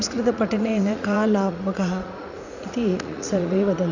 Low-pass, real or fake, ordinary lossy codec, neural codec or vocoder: 7.2 kHz; fake; none; vocoder, 44.1 kHz, 80 mel bands, Vocos